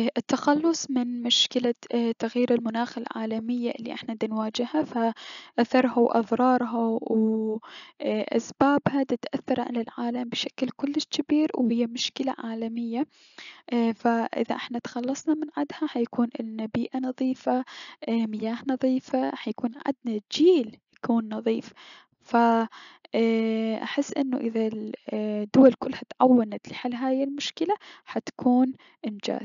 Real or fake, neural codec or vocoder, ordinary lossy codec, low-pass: real; none; none; 7.2 kHz